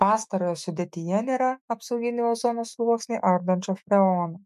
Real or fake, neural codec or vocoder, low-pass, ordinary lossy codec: fake; autoencoder, 48 kHz, 128 numbers a frame, DAC-VAE, trained on Japanese speech; 14.4 kHz; MP3, 64 kbps